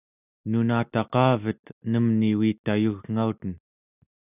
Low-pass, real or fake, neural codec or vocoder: 3.6 kHz; real; none